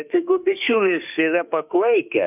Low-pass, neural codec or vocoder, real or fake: 3.6 kHz; autoencoder, 48 kHz, 32 numbers a frame, DAC-VAE, trained on Japanese speech; fake